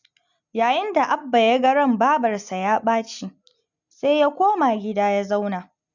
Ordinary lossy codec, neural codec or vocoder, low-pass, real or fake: none; none; 7.2 kHz; real